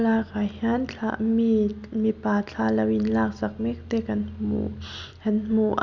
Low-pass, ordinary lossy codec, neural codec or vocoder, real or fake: 7.2 kHz; none; none; real